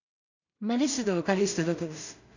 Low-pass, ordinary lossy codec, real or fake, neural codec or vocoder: 7.2 kHz; none; fake; codec, 16 kHz in and 24 kHz out, 0.4 kbps, LongCat-Audio-Codec, two codebook decoder